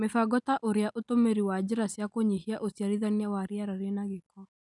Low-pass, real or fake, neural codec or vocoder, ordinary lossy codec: 10.8 kHz; real; none; none